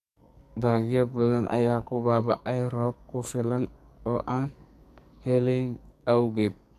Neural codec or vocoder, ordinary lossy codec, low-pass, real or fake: codec, 44.1 kHz, 2.6 kbps, SNAC; none; 14.4 kHz; fake